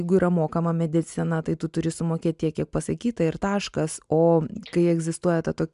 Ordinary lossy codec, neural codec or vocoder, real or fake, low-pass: MP3, 96 kbps; none; real; 10.8 kHz